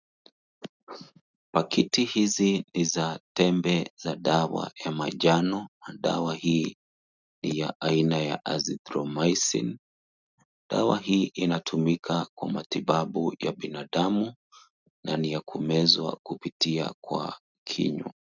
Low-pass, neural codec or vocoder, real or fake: 7.2 kHz; none; real